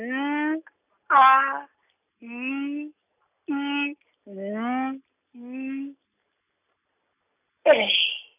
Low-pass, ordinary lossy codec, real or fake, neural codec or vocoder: 3.6 kHz; none; real; none